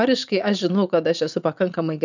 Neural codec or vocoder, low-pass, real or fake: vocoder, 44.1 kHz, 80 mel bands, Vocos; 7.2 kHz; fake